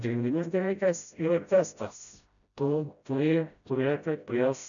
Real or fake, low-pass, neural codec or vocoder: fake; 7.2 kHz; codec, 16 kHz, 0.5 kbps, FreqCodec, smaller model